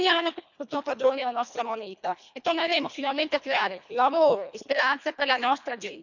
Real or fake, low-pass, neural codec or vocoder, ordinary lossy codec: fake; 7.2 kHz; codec, 24 kHz, 1.5 kbps, HILCodec; none